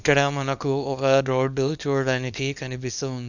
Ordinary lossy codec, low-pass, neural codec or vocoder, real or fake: none; 7.2 kHz; codec, 24 kHz, 0.9 kbps, WavTokenizer, small release; fake